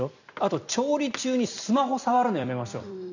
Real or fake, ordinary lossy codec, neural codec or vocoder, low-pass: fake; none; vocoder, 44.1 kHz, 128 mel bands every 256 samples, BigVGAN v2; 7.2 kHz